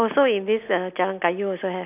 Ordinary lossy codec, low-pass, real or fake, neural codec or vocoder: none; 3.6 kHz; real; none